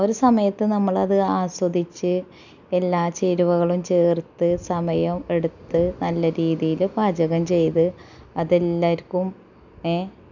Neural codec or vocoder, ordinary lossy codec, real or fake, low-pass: none; none; real; 7.2 kHz